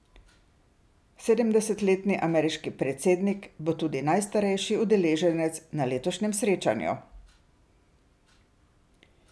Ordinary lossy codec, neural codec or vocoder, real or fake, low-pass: none; none; real; none